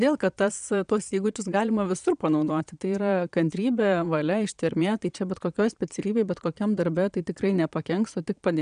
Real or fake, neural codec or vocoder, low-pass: fake; vocoder, 22.05 kHz, 80 mel bands, Vocos; 9.9 kHz